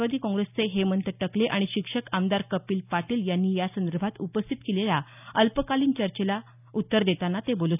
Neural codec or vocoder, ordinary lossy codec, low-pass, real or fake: none; none; 3.6 kHz; real